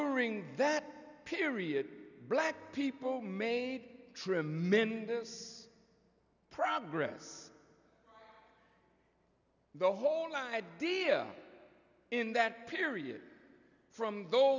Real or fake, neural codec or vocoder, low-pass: real; none; 7.2 kHz